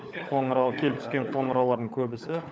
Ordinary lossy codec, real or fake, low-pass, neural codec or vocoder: none; fake; none; codec, 16 kHz, 16 kbps, FunCodec, trained on LibriTTS, 50 frames a second